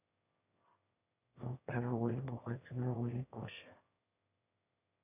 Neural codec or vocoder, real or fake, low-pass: autoencoder, 22.05 kHz, a latent of 192 numbers a frame, VITS, trained on one speaker; fake; 3.6 kHz